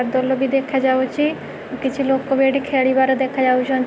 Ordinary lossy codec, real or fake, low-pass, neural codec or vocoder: none; real; none; none